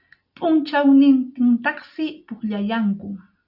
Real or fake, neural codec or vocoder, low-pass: real; none; 5.4 kHz